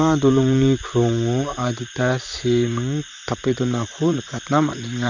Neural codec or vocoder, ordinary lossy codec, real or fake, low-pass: vocoder, 44.1 kHz, 128 mel bands, Pupu-Vocoder; none; fake; 7.2 kHz